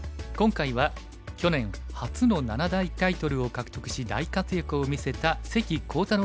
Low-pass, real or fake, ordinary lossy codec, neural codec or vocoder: none; real; none; none